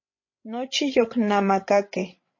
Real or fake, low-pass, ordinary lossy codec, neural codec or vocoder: fake; 7.2 kHz; MP3, 32 kbps; codec, 16 kHz, 16 kbps, FreqCodec, larger model